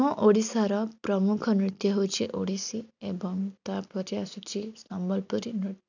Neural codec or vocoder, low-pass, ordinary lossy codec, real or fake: none; 7.2 kHz; none; real